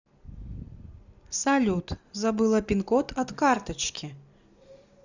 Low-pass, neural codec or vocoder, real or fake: 7.2 kHz; none; real